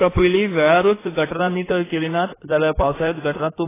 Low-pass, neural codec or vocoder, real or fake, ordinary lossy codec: 3.6 kHz; codec, 16 kHz in and 24 kHz out, 2.2 kbps, FireRedTTS-2 codec; fake; AAC, 16 kbps